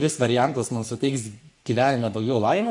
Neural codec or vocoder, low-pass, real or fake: codec, 44.1 kHz, 3.4 kbps, Pupu-Codec; 10.8 kHz; fake